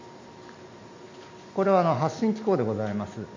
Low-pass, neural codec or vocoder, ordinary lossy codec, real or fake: 7.2 kHz; none; MP3, 48 kbps; real